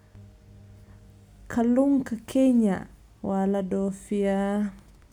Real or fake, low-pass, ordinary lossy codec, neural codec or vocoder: real; 19.8 kHz; none; none